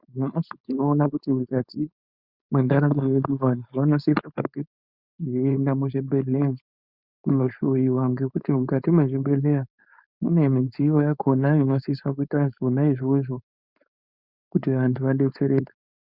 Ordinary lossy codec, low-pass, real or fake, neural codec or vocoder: Opus, 64 kbps; 5.4 kHz; fake; codec, 16 kHz, 4.8 kbps, FACodec